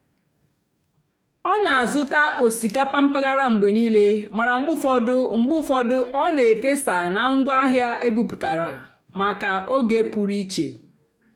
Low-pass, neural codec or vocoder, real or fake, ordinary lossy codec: 19.8 kHz; codec, 44.1 kHz, 2.6 kbps, DAC; fake; none